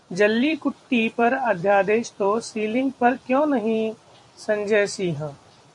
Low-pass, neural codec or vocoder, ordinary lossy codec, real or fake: 10.8 kHz; vocoder, 44.1 kHz, 128 mel bands every 256 samples, BigVGAN v2; MP3, 64 kbps; fake